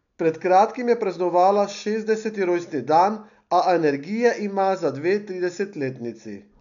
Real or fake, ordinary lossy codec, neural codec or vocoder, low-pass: real; none; none; 7.2 kHz